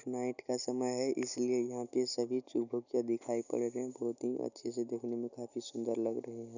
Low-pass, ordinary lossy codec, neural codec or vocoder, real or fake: 7.2 kHz; none; none; real